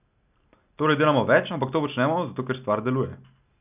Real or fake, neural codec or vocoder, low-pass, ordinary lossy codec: real; none; 3.6 kHz; none